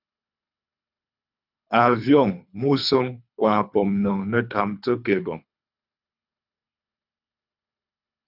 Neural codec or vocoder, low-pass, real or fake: codec, 24 kHz, 3 kbps, HILCodec; 5.4 kHz; fake